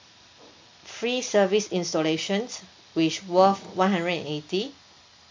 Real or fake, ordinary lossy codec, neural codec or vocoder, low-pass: real; MP3, 64 kbps; none; 7.2 kHz